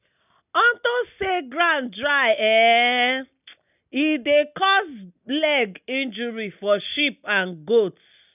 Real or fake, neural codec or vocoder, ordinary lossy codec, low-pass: real; none; none; 3.6 kHz